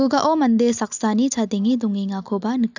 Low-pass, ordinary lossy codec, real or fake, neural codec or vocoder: 7.2 kHz; none; real; none